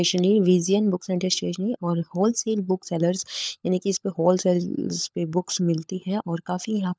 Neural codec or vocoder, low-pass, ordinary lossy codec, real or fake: codec, 16 kHz, 16 kbps, FunCodec, trained on LibriTTS, 50 frames a second; none; none; fake